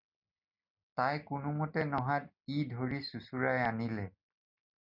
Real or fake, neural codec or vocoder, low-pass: real; none; 5.4 kHz